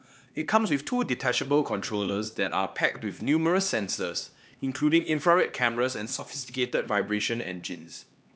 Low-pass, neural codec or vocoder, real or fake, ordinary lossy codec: none; codec, 16 kHz, 2 kbps, X-Codec, HuBERT features, trained on LibriSpeech; fake; none